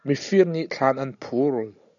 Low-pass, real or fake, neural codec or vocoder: 7.2 kHz; real; none